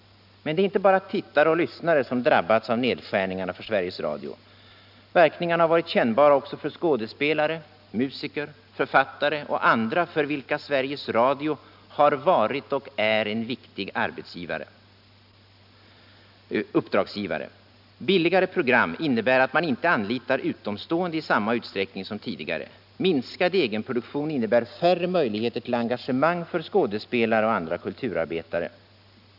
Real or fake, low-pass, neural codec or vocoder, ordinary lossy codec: real; 5.4 kHz; none; none